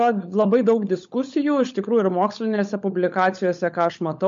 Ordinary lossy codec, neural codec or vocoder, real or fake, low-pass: MP3, 48 kbps; codec, 16 kHz, 16 kbps, FunCodec, trained on LibriTTS, 50 frames a second; fake; 7.2 kHz